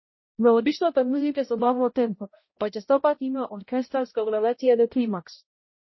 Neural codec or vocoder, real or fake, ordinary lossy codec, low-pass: codec, 16 kHz, 0.5 kbps, X-Codec, HuBERT features, trained on balanced general audio; fake; MP3, 24 kbps; 7.2 kHz